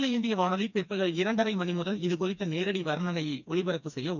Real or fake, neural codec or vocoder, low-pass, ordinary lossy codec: fake; codec, 16 kHz, 2 kbps, FreqCodec, smaller model; 7.2 kHz; none